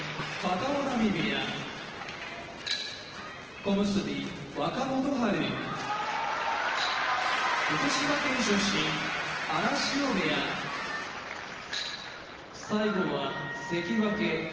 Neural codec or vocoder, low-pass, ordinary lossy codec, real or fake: none; 7.2 kHz; Opus, 16 kbps; real